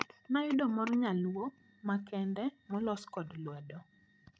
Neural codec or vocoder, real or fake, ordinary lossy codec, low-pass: codec, 16 kHz, 16 kbps, FreqCodec, larger model; fake; none; none